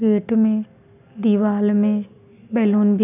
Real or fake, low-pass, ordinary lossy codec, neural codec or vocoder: real; 3.6 kHz; none; none